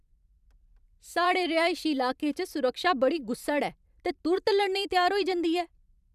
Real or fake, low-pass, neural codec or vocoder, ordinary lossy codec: fake; 14.4 kHz; vocoder, 44.1 kHz, 128 mel bands every 512 samples, BigVGAN v2; none